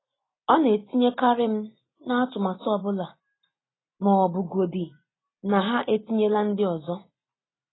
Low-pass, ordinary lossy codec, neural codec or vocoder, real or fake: 7.2 kHz; AAC, 16 kbps; none; real